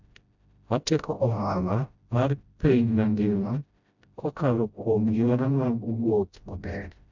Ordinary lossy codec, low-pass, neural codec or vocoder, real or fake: none; 7.2 kHz; codec, 16 kHz, 0.5 kbps, FreqCodec, smaller model; fake